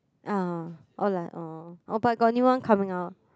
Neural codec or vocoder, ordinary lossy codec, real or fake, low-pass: none; none; real; none